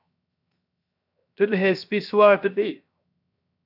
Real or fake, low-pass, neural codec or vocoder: fake; 5.4 kHz; codec, 16 kHz, 0.7 kbps, FocalCodec